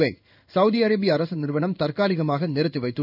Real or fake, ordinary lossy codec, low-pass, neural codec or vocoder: fake; none; 5.4 kHz; codec, 16 kHz in and 24 kHz out, 1 kbps, XY-Tokenizer